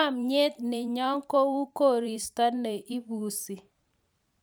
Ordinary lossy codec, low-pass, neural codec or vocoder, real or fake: none; none; vocoder, 44.1 kHz, 128 mel bands every 512 samples, BigVGAN v2; fake